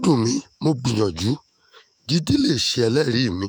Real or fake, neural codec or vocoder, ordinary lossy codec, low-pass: fake; autoencoder, 48 kHz, 128 numbers a frame, DAC-VAE, trained on Japanese speech; none; none